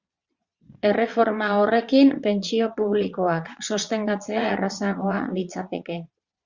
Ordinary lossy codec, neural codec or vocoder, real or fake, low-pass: Opus, 64 kbps; vocoder, 22.05 kHz, 80 mel bands, Vocos; fake; 7.2 kHz